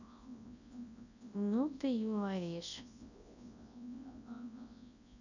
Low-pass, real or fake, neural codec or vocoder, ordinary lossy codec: 7.2 kHz; fake; codec, 24 kHz, 0.9 kbps, WavTokenizer, large speech release; none